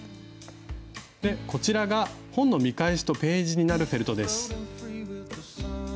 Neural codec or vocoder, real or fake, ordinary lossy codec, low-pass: none; real; none; none